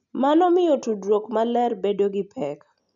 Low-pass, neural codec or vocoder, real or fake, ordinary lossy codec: 7.2 kHz; none; real; none